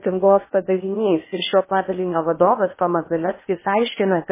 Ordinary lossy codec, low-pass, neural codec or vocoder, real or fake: MP3, 16 kbps; 3.6 kHz; codec, 16 kHz in and 24 kHz out, 0.8 kbps, FocalCodec, streaming, 65536 codes; fake